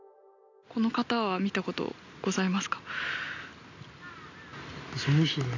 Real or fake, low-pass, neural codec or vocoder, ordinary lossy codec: real; 7.2 kHz; none; none